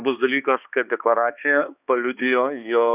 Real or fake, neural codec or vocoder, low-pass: fake; codec, 16 kHz, 2 kbps, X-Codec, HuBERT features, trained on balanced general audio; 3.6 kHz